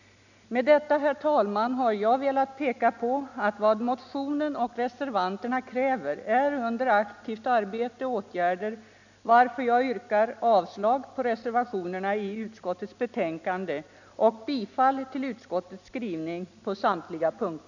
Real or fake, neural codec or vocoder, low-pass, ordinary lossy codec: real; none; 7.2 kHz; none